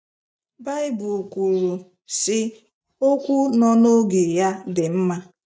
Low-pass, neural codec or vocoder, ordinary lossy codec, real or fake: none; none; none; real